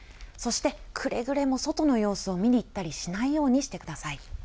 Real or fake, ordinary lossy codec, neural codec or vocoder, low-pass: real; none; none; none